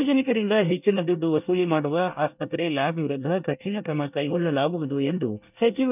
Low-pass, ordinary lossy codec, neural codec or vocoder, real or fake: 3.6 kHz; none; codec, 24 kHz, 1 kbps, SNAC; fake